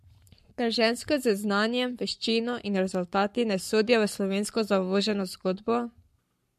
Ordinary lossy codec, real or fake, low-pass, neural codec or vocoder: MP3, 64 kbps; fake; 14.4 kHz; codec, 44.1 kHz, 7.8 kbps, Pupu-Codec